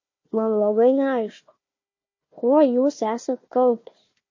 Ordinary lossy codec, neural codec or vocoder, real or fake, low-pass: MP3, 32 kbps; codec, 16 kHz, 1 kbps, FunCodec, trained on Chinese and English, 50 frames a second; fake; 7.2 kHz